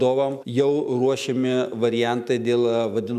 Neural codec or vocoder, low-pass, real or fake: autoencoder, 48 kHz, 128 numbers a frame, DAC-VAE, trained on Japanese speech; 14.4 kHz; fake